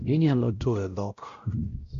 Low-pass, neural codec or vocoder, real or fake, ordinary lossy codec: 7.2 kHz; codec, 16 kHz, 0.5 kbps, X-Codec, WavLM features, trained on Multilingual LibriSpeech; fake; Opus, 64 kbps